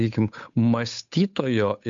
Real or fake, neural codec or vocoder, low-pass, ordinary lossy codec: fake; codec, 16 kHz, 8 kbps, FunCodec, trained on Chinese and English, 25 frames a second; 7.2 kHz; MP3, 64 kbps